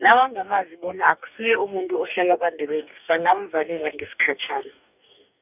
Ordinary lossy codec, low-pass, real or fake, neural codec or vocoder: none; 3.6 kHz; fake; codec, 44.1 kHz, 2.6 kbps, DAC